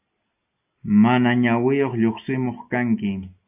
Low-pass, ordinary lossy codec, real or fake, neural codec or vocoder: 3.6 kHz; AAC, 32 kbps; real; none